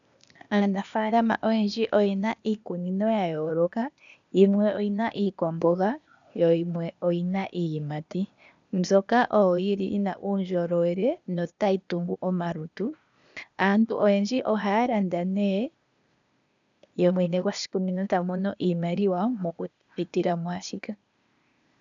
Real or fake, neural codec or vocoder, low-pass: fake; codec, 16 kHz, 0.8 kbps, ZipCodec; 7.2 kHz